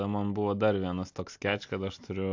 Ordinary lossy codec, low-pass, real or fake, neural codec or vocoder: AAC, 48 kbps; 7.2 kHz; real; none